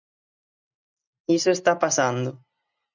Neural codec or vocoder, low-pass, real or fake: none; 7.2 kHz; real